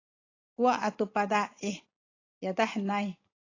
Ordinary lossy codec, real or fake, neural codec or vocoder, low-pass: AAC, 32 kbps; real; none; 7.2 kHz